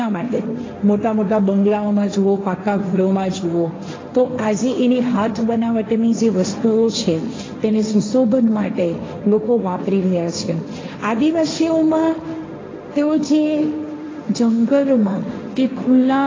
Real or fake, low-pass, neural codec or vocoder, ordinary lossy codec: fake; 7.2 kHz; codec, 16 kHz, 1.1 kbps, Voila-Tokenizer; AAC, 32 kbps